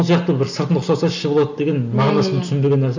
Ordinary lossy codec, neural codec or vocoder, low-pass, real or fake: none; none; 7.2 kHz; real